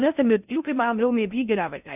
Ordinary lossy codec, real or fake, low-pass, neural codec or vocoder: none; fake; 3.6 kHz; codec, 16 kHz in and 24 kHz out, 0.6 kbps, FocalCodec, streaming, 4096 codes